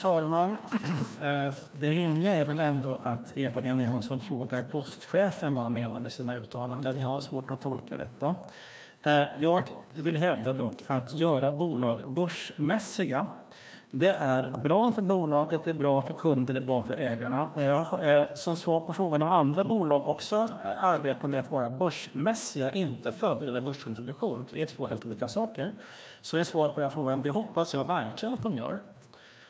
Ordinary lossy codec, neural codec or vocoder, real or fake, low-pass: none; codec, 16 kHz, 1 kbps, FreqCodec, larger model; fake; none